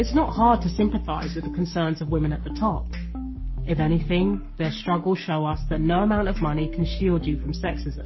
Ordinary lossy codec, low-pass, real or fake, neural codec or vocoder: MP3, 24 kbps; 7.2 kHz; fake; codec, 44.1 kHz, 7.8 kbps, Pupu-Codec